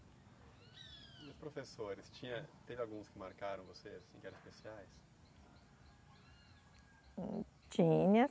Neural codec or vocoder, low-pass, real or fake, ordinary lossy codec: none; none; real; none